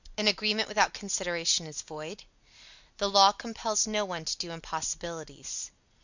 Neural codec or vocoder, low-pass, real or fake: none; 7.2 kHz; real